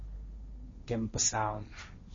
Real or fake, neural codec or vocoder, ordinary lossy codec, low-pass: fake; codec, 16 kHz, 1.1 kbps, Voila-Tokenizer; MP3, 32 kbps; 7.2 kHz